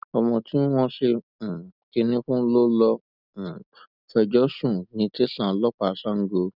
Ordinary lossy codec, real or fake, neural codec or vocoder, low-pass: none; real; none; 5.4 kHz